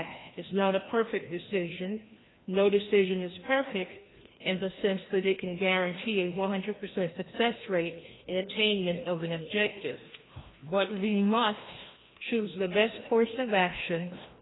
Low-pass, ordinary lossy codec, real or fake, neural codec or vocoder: 7.2 kHz; AAC, 16 kbps; fake; codec, 16 kHz, 1 kbps, FreqCodec, larger model